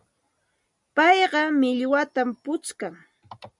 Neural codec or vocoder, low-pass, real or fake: none; 10.8 kHz; real